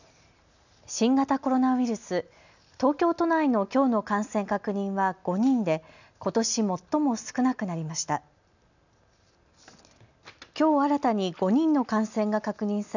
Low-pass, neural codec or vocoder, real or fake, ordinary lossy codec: 7.2 kHz; none; real; none